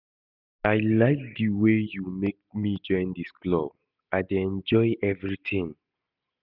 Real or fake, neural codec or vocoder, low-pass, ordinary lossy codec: real; none; 5.4 kHz; none